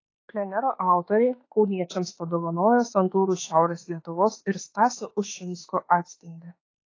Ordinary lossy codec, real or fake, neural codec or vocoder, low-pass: AAC, 32 kbps; fake; autoencoder, 48 kHz, 32 numbers a frame, DAC-VAE, trained on Japanese speech; 7.2 kHz